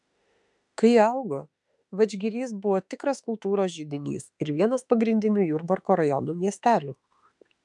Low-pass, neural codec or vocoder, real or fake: 10.8 kHz; autoencoder, 48 kHz, 32 numbers a frame, DAC-VAE, trained on Japanese speech; fake